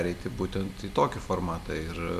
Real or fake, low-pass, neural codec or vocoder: real; 14.4 kHz; none